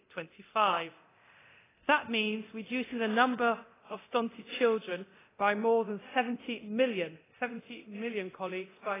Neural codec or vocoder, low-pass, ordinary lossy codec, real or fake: codec, 24 kHz, 0.9 kbps, DualCodec; 3.6 kHz; AAC, 16 kbps; fake